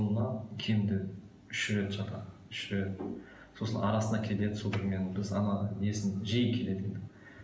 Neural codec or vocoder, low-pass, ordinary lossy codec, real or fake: none; none; none; real